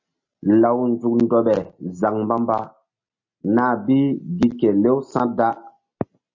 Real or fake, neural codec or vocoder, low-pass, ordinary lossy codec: real; none; 7.2 kHz; MP3, 32 kbps